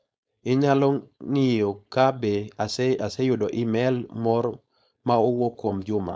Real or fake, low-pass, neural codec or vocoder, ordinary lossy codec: fake; none; codec, 16 kHz, 4.8 kbps, FACodec; none